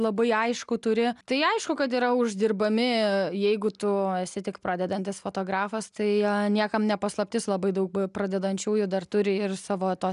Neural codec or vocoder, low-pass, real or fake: none; 10.8 kHz; real